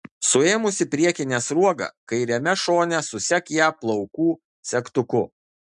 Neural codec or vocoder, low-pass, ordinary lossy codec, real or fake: none; 10.8 kHz; MP3, 96 kbps; real